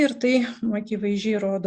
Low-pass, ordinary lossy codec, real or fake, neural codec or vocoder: 9.9 kHz; Opus, 64 kbps; real; none